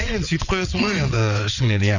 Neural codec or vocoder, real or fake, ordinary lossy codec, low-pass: vocoder, 22.05 kHz, 80 mel bands, WaveNeXt; fake; none; 7.2 kHz